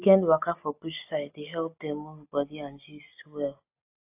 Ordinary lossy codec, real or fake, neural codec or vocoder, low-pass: AAC, 32 kbps; real; none; 3.6 kHz